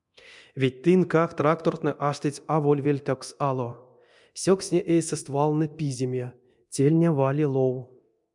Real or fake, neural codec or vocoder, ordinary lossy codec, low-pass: fake; codec, 24 kHz, 0.9 kbps, DualCodec; MP3, 96 kbps; 10.8 kHz